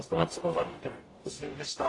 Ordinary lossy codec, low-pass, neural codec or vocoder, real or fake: AAC, 32 kbps; 10.8 kHz; codec, 44.1 kHz, 0.9 kbps, DAC; fake